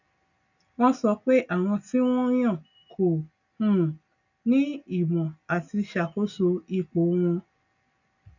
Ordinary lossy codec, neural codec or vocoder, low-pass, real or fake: none; none; 7.2 kHz; real